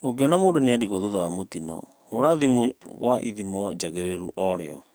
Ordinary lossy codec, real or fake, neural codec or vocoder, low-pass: none; fake; codec, 44.1 kHz, 2.6 kbps, SNAC; none